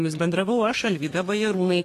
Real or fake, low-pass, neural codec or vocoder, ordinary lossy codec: fake; 14.4 kHz; codec, 32 kHz, 1.9 kbps, SNAC; AAC, 48 kbps